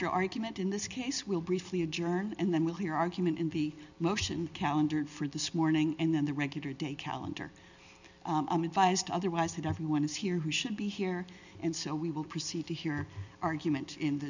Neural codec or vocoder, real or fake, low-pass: none; real; 7.2 kHz